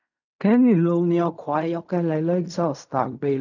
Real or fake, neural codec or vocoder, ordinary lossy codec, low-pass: fake; codec, 16 kHz in and 24 kHz out, 0.4 kbps, LongCat-Audio-Codec, fine tuned four codebook decoder; none; 7.2 kHz